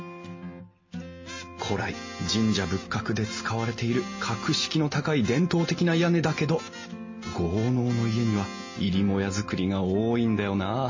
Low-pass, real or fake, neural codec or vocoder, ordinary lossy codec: 7.2 kHz; real; none; MP3, 32 kbps